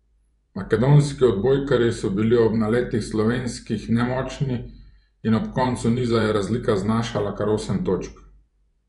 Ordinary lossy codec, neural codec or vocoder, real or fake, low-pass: none; vocoder, 24 kHz, 100 mel bands, Vocos; fake; 10.8 kHz